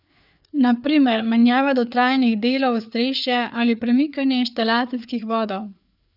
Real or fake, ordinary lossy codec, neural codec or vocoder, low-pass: fake; none; codec, 16 kHz, 4 kbps, FreqCodec, larger model; 5.4 kHz